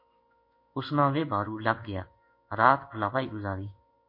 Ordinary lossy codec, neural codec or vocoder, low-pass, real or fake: MP3, 32 kbps; codec, 16 kHz in and 24 kHz out, 1 kbps, XY-Tokenizer; 5.4 kHz; fake